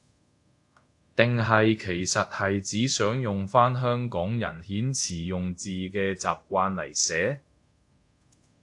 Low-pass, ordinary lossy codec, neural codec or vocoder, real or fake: 10.8 kHz; AAC, 64 kbps; codec, 24 kHz, 0.5 kbps, DualCodec; fake